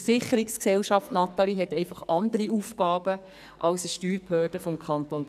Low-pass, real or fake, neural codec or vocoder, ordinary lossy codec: 14.4 kHz; fake; codec, 44.1 kHz, 2.6 kbps, SNAC; none